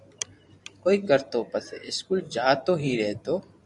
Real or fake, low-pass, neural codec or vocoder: fake; 10.8 kHz; vocoder, 44.1 kHz, 128 mel bands every 512 samples, BigVGAN v2